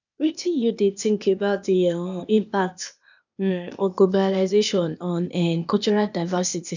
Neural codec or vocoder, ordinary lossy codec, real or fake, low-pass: codec, 16 kHz, 0.8 kbps, ZipCodec; none; fake; 7.2 kHz